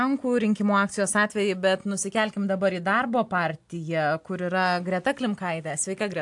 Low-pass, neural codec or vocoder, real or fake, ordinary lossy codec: 10.8 kHz; none; real; AAC, 64 kbps